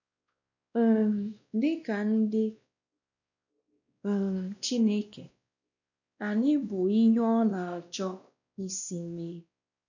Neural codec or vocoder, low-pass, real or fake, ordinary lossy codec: codec, 16 kHz, 1 kbps, X-Codec, WavLM features, trained on Multilingual LibriSpeech; 7.2 kHz; fake; none